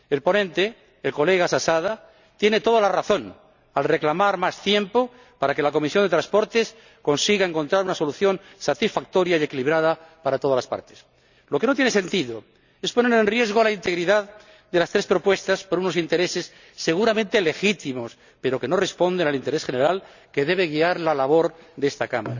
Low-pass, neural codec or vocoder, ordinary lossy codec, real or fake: 7.2 kHz; none; none; real